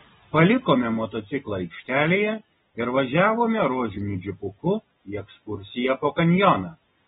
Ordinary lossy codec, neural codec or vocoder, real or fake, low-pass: AAC, 16 kbps; none; real; 7.2 kHz